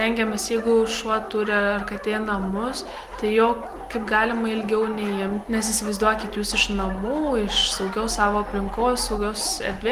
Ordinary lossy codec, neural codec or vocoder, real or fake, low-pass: Opus, 24 kbps; none; real; 14.4 kHz